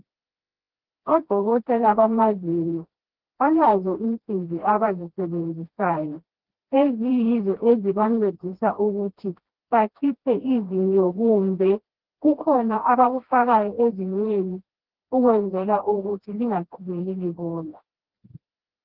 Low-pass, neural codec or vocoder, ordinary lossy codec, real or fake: 5.4 kHz; codec, 16 kHz, 1 kbps, FreqCodec, smaller model; Opus, 16 kbps; fake